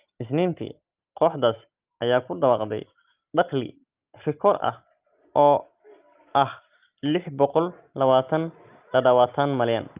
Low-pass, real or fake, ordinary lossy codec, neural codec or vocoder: 3.6 kHz; real; Opus, 32 kbps; none